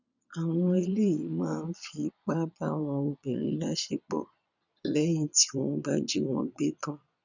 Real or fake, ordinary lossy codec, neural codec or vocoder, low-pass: fake; none; vocoder, 22.05 kHz, 80 mel bands, Vocos; 7.2 kHz